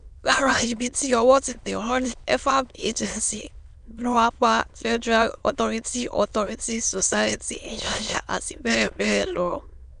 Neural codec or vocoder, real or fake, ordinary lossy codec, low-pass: autoencoder, 22.05 kHz, a latent of 192 numbers a frame, VITS, trained on many speakers; fake; none; 9.9 kHz